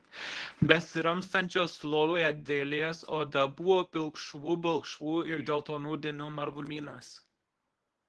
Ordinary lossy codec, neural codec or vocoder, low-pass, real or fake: Opus, 16 kbps; codec, 24 kHz, 0.9 kbps, WavTokenizer, medium speech release version 1; 10.8 kHz; fake